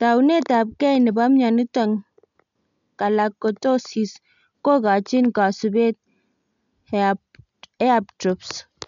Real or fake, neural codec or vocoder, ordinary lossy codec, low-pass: real; none; none; 7.2 kHz